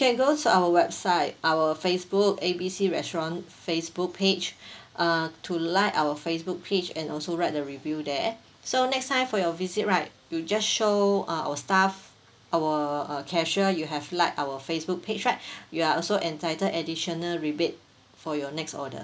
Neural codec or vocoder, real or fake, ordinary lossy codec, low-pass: none; real; none; none